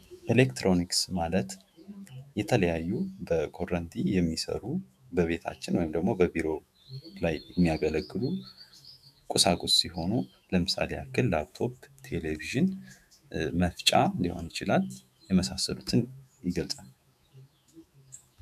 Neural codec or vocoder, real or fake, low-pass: autoencoder, 48 kHz, 128 numbers a frame, DAC-VAE, trained on Japanese speech; fake; 14.4 kHz